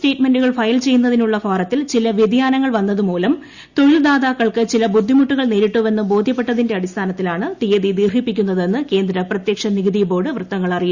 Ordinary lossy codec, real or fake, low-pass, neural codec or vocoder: Opus, 64 kbps; real; 7.2 kHz; none